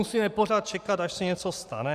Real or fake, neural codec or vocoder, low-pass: fake; vocoder, 44.1 kHz, 128 mel bands every 512 samples, BigVGAN v2; 14.4 kHz